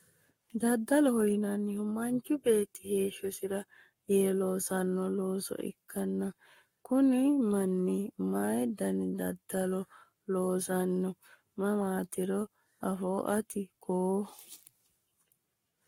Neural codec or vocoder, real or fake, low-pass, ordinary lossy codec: codec, 44.1 kHz, 7.8 kbps, Pupu-Codec; fake; 19.8 kHz; AAC, 48 kbps